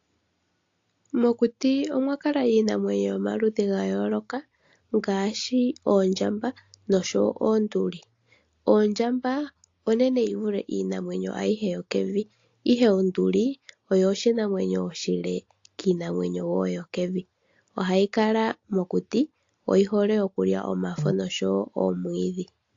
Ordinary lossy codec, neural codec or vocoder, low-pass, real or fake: AAC, 48 kbps; none; 7.2 kHz; real